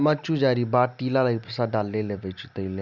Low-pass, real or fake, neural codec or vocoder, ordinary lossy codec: 7.2 kHz; real; none; none